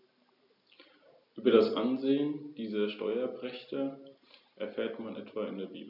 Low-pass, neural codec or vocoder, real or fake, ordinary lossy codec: 5.4 kHz; none; real; none